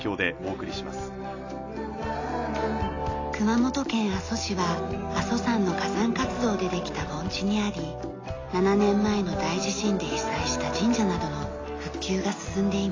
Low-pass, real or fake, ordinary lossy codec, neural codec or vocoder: 7.2 kHz; real; AAC, 32 kbps; none